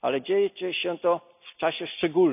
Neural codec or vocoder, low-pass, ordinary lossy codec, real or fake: none; 3.6 kHz; none; real